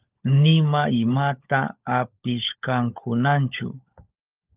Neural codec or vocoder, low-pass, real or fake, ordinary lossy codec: codec, 16 kHz, 16 kbps, FunCodec, trained on LibriTTS, 50 frames a second; 3.6 kHz; fake; Opus, 24 kbps